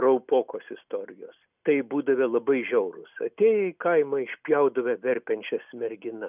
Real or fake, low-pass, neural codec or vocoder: real; 3.6 kHz; none